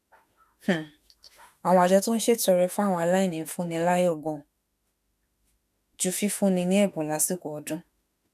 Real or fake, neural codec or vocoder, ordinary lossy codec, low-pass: fake; autoencoder, 48 kHz, 32 numbers a frame, DAC-VAE, trained on Japanese speech; none; 14.4 kHz